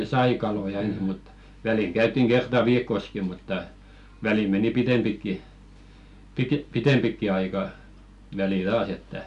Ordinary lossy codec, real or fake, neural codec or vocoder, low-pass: none; real; none; 10.8 kHz